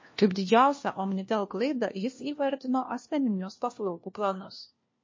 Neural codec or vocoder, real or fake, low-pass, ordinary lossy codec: codec, 16 kHz, 1 kbps, FunCodec, trained on LibriTTS, 50 frames a second; fake; 7.2 kHz; MP3, 32 kbps